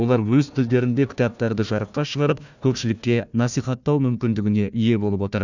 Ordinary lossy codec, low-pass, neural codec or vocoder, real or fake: none; 7.2 kHz; codec, 16 kHz, 1 kbps, FunCodec, trained on Chinese and English, 50 frames a second; fake